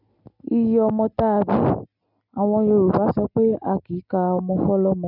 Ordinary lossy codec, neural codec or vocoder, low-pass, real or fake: none; none; 5.4 kHz; real